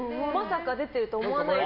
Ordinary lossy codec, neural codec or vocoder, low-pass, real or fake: none; none; 5.4 kHz; real